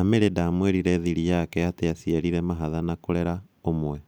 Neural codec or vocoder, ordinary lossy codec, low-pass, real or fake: none; none; none; real